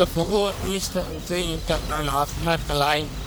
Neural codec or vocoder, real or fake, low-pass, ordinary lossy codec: codec, 44.1 kHz, 1.7 kbps, Pupu-Codec; fake; none; none